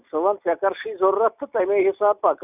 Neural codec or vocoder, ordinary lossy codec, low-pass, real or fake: none; none; 3.6 kHz; real